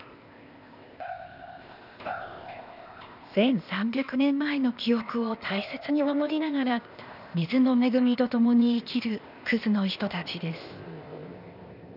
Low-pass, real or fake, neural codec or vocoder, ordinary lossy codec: 5.4 kHz; fake; codec, 16 kHz, 0.8 kbps, ZipCodec; none